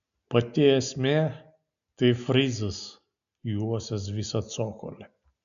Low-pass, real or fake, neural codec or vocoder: 7.2 kHz; real; none